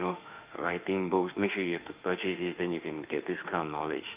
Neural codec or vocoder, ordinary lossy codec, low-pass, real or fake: autoencoder, 48 kHz, 32 numbers a frame, DAC-VAE, trained on Japanese speech; Opus, 24 kbps; 3.6 kHz; fake